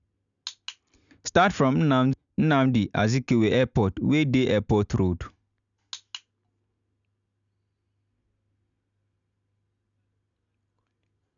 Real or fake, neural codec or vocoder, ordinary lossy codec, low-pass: real; none; none; 7.2 kHz